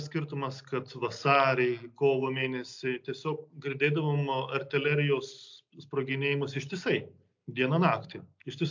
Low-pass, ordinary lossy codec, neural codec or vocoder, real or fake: 7.2 kHz; MP3, 64 kbps; none; real